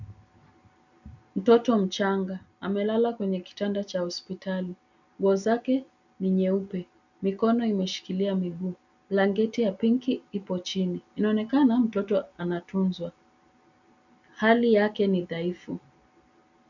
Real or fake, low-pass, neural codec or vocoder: real; 7.2 kHz; none